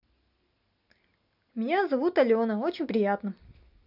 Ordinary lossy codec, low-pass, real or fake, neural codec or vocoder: none; 5.4 kHz; real; none